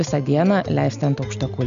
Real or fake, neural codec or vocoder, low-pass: real; none; 7.2 kHz